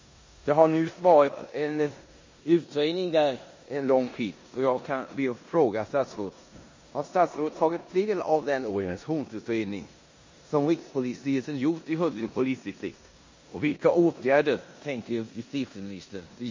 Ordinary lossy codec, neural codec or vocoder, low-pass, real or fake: MP3, 32 kbps; codec, 16 kHz in and 24 kHz out, 0.9 kbps, LongCat-Audio-Codec, four codebook decoder; 7.2 kHz; fake